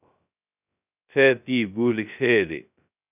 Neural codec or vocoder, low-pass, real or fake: codec, 16 kHz, 0.2 kbps, FocalCodec; 3.6 kHz; fake